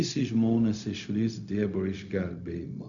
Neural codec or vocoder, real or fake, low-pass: codec, 16 kHz, 0.4 kbps, LongCat-Audio-Codec; fake; 7.2 kHz